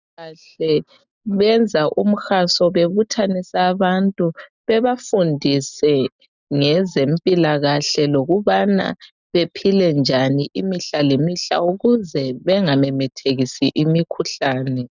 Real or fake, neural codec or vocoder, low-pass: real; none; 7.2 kHz